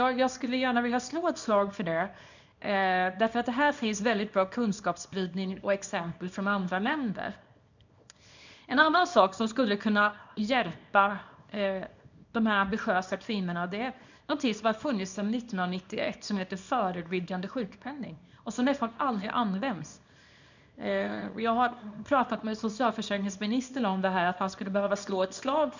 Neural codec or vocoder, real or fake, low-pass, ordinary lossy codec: codec, 24 kHz, 0.9 kbps, WavTokenizer, small release; fake; 7.2 kHz; none